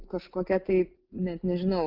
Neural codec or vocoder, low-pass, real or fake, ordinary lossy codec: none; 5.4 kHz; real; Opus, 24 kbps